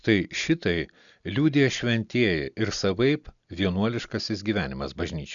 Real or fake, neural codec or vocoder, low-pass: real; none; 7.2 kHz